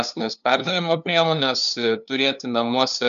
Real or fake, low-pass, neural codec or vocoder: fake; 7.2 kHz; codec, 16 kHz, 2 kbps, FunCodec, trained on LibriTTS, 25 frames a second